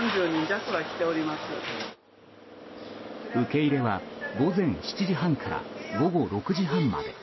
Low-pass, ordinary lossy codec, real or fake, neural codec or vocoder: 7.2 kHz; MP3, 24 kbps; real; none